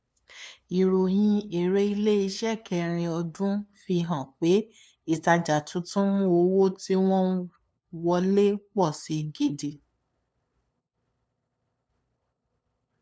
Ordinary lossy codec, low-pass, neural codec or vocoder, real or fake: none; none; codec, 16 kHz, 2 kbps, FunCodec, trained on LibriTTS, 25 frames a second; fake